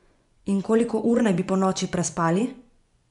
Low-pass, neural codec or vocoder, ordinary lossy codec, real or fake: 10.8 kHz; vocoder, 24 kHz, 100 mel bands, Vocos; none; fake